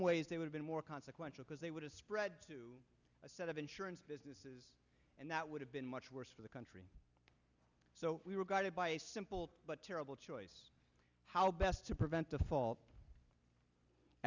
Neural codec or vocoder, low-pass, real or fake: none; 7.2 kHz; real